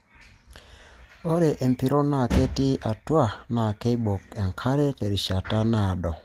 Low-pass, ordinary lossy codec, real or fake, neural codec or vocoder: 14.4 kHz; Opus, 24 kbps; real; none